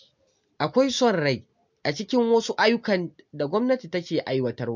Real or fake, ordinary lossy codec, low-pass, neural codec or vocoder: real; MP3, 64 kbps; 7.2 kHz; none